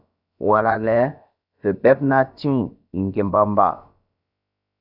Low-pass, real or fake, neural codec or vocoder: 5.4 kHz; fake; codec, 16 kHz, about 1 kbps, DyCAST, with the encoder's durations